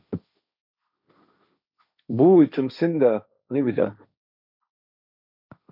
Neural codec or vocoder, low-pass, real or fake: codec, 16 kHz, 1.1 kbps, Voila-Tokenizer; 5.4 kHz; fake